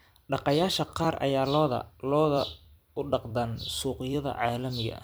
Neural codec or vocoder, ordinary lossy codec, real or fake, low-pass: vocoder, 44.1 kHz, 128 mel bands every 256 samples, BigVGAN v2; none; fake; none